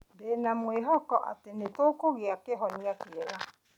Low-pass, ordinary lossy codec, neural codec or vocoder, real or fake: 19.8 kHz; none; none; real